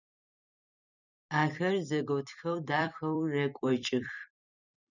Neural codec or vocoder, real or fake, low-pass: vocoder, 44.1 kHz, 128 mel bands every 256 samples, BigVGAN v2; fake; 7.2 kHz